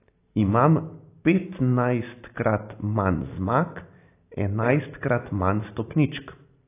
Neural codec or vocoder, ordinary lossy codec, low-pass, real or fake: none; AAC, 24 kbps; 3.6 kHz; real